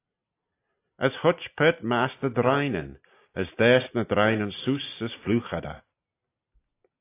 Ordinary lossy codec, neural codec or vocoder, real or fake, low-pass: AAC, 24 kbps; none; real; 3.6 kHz